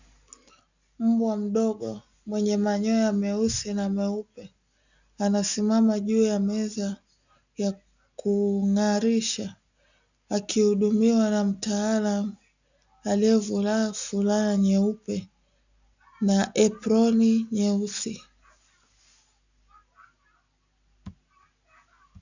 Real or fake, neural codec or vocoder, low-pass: real; none; 7.2 kHz